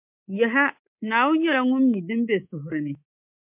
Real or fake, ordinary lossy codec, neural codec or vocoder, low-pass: fake; MP3, 32 kbps; codec, 24 kHz, 3.1 kbps, DualCodec; 3.6 kHz